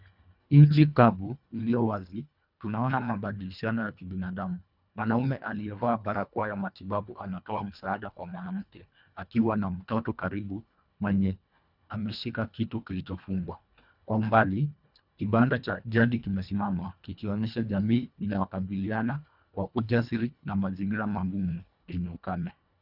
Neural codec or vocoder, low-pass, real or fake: codec, 24 kHz, 1.5 kbps, HILCodec; 5.4 kHz; fake